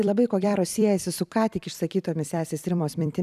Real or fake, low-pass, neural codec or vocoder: fake; 14.4 kHz; vocoder, 44.1 kHz, 128 mel bands every 256 samples, BigVGAN v2